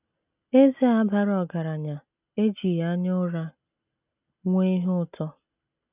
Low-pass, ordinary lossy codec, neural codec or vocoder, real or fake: 3.6 kHz; none; none; real